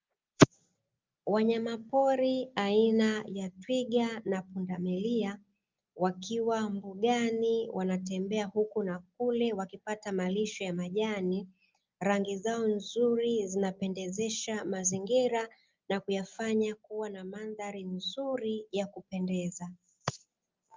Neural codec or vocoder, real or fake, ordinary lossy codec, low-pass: none; real; Opus, 24 kbps; 7.2 kHz